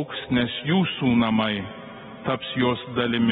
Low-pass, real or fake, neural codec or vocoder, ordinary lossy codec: 10.8 kHz; real; none; AAC, 16 kbps